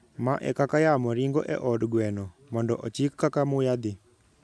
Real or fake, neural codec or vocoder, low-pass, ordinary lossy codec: real; none; none; none